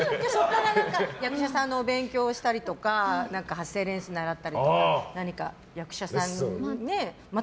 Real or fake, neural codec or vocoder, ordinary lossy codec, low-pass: real; none; none; none